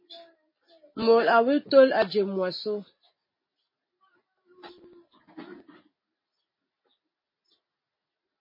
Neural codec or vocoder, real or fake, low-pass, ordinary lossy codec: none; real; 5.4 kHz; MP3, 24 kbps